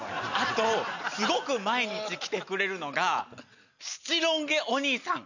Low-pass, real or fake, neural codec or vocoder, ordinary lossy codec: 7.2 kHz; real; none; none